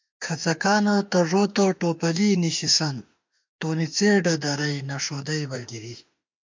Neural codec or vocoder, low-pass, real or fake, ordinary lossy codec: autoencoder, 48 kHz, 32 numbers a frame, DAC-VAE, trained on Japanese speech; 7.2 kHz; fake; MP3, 64 kbps